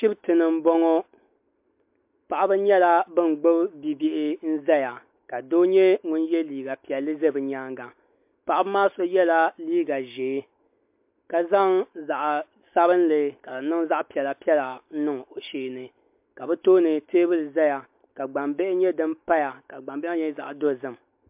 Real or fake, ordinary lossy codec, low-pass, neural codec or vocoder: real; MP3, 32 kbps; 3.6 kHz; none